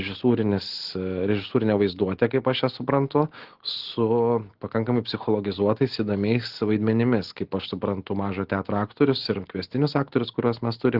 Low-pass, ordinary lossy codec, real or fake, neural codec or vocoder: 5.4 kHz; Opus, 16 kbps; real; none